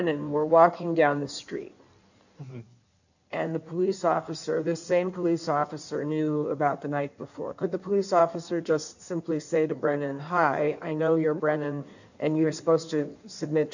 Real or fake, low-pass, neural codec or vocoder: fake; 7.2 kHz; codec, 16 kHz in and 24 kHz out, 1.1 kbps, FireRedTTS-2 codec